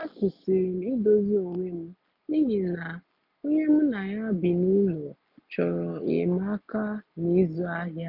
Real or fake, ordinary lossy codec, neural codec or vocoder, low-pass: real; none; none; 5.4 kHz